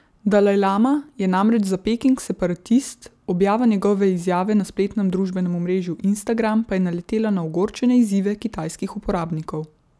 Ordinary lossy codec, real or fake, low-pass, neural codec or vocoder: none; real; none; none